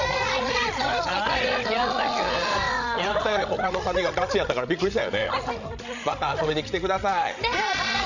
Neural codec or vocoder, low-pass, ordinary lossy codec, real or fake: codec, 16 kHz, 16 kbps, FreqCodec, larger model; 7.2 kHz; none; fake